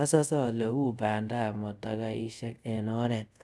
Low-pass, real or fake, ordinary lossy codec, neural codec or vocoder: none; fake; none; codec, 24 kHz, 0.5 kbps, DualCodec